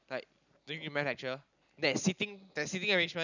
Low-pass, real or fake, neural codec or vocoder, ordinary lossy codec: 7.2 kHz; real; none; none